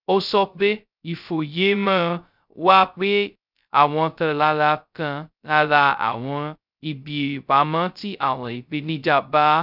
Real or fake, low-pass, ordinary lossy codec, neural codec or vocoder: fake; 5.4 kHz; none; codec, 16 kHz, 0.2 kbps, FocalCodec